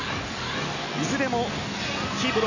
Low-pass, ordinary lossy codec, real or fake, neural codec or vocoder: 7.2 kHz; none; real; none